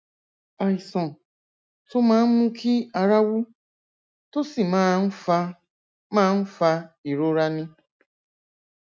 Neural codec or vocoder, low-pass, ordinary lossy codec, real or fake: none; none; none; real